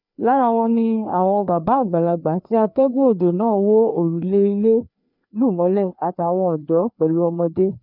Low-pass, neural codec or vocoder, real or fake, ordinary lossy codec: 5.4 kHz; codec, 16 kHz, 2 kbps, FreqCodec, larger model; fake; none